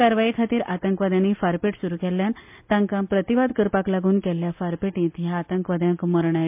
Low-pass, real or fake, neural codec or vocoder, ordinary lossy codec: 3.6 kHz; real; none; MP3, 32 kbps